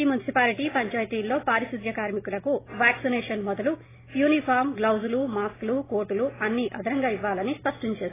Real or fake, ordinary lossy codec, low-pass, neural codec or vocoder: real; AAC, 16 kbps; 3.6 kHz; none